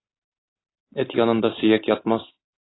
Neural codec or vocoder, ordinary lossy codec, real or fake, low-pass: none; AAC, 16 kbps; real; 7.2 kHz